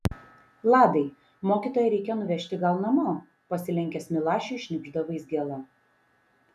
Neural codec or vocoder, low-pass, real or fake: none; 14.4 kHz; real